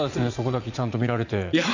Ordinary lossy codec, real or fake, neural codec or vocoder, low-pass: none; fake; vocoder, 44.1 kHz, 80 mel bands, Vocos; 7.2 kHz